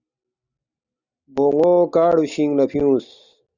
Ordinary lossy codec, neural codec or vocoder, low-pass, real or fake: Opus, 64 kbps; none; 7.2 kHz; real